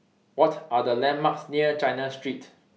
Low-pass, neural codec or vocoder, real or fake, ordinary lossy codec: none; none; real; none